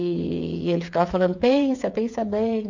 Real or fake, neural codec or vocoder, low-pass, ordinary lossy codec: fake; codec, 16 kHz, 8 kbps, FreqCodec, smaller model; 7.2 kHz; MP3, 48 kbps